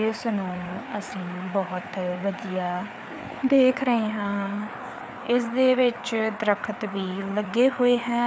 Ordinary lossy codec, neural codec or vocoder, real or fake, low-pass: none; codec, 16 kHz, 4 kbps, FreqCodec, larger model; fake; none